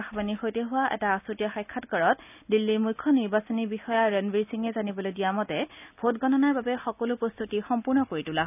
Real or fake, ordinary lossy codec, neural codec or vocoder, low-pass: real; none; none; 3.6 kHz